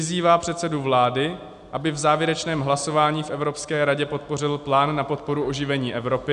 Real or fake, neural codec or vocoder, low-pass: real; none; 10.8 kHz